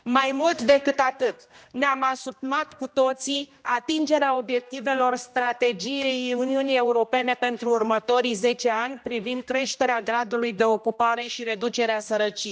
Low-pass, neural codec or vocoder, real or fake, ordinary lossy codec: none; codec, 16 kHz, 1 kbps, X-Codec, HuBERT features, trained on general audio; fake; none